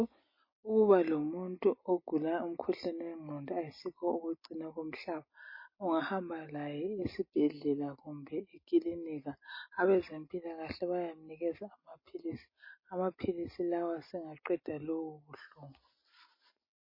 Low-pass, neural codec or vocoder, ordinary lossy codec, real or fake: 5.4 kHz; none; MP3, 24 kbps; real